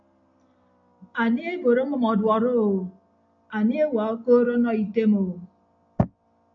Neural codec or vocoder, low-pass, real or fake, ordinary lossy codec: none; 7.2 kHz; real; AAC, 64 kbps